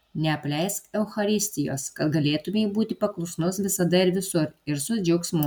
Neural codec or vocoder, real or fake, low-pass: none; real; 19.8 kHz